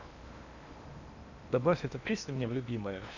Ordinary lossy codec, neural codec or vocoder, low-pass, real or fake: none; codec, 16 kHz in and 24 kHz out, 0.8 kbps, FocalCodec, streaming, 65536 codes; 7.2 kHz; fake